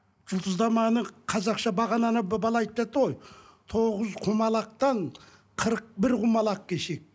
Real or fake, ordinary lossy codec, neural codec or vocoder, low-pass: real; none; none; none